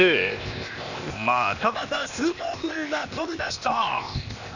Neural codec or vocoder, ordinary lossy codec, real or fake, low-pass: codec, 16 kHz, 0.8 kbps, ZipCodec; none; fake; 7.2 kHz